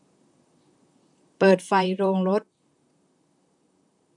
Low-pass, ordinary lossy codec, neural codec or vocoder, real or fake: 10.8 kHz; none; vocoder, 44.1 kHz, 128 mel bands, Pupu-Vocoder; fake